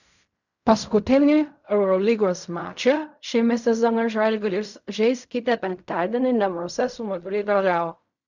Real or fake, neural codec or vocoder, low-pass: fake; codec, 16 kHz in and 24 kHz out, 0.4 kbps, LongCat-Audio-Codec, fine tuned four codebook decoder; 7.2 kHz